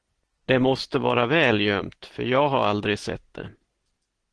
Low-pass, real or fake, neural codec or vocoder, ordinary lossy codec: 9.9 kHz; real; none; Opus, 16 kbps